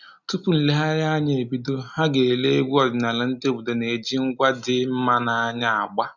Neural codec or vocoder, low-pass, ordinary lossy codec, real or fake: none; 7.2 kHz; none; real